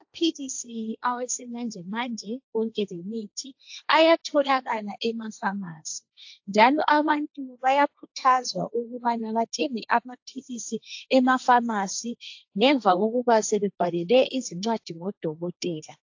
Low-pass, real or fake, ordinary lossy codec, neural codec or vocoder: 7.2 kHz; fake; AAC, 48 kbps; codec, 16 kHz, 1.1 kbps, Voila-Tokenizer